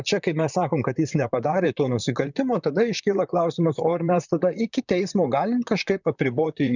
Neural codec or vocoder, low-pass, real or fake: vocoder, 44.1 kHz, 128 mel bands, Pupu-Vocoder; 7.2 kHz; fake